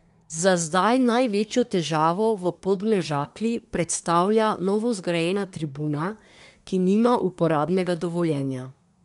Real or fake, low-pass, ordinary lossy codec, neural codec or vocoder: fake; 10.8 kHz; none; codec, 24 kHz, 1 kbps, SNAC